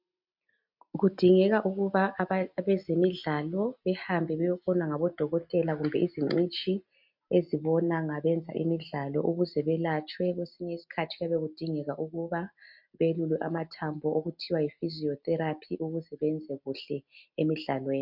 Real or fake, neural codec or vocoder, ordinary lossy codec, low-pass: real; none; MP3, 48 kbps; 5.4 kHz